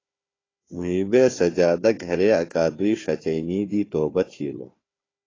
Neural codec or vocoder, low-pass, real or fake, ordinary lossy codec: codec, 16 kHz, 4 kbps, FunCodec, trained on Chinese and English, 50 frames a second; 7.2 kHz; fake; AAC, 32 kbps